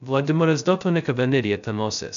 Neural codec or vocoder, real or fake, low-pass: codec, 16 kHz, 0.2 kbps, FocalCodec; fake; 7.2 kHz